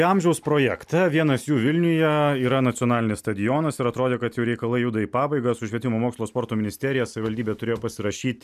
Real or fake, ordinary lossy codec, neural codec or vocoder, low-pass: real; MP3, 96 kbps; none; 14.4 kHz